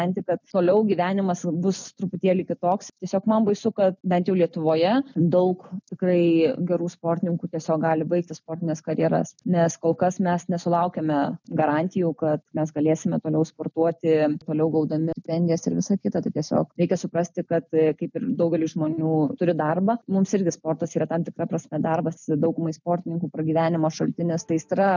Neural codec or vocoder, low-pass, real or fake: none; 7.2 kHz; real